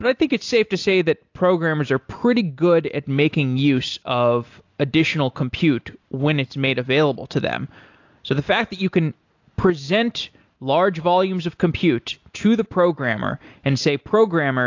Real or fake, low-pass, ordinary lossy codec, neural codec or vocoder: real; 7.2 kHz; AAC, 48 kbps; none